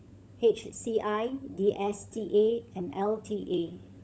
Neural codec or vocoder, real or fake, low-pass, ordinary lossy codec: codec, 16 kHz, 16 kbps, FunCodec, trained on LibriTTS, 50 frames a second; fake; none; none